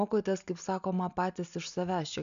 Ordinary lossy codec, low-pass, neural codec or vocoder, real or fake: AAC, 96 kbps; 7.2 kHz; none; real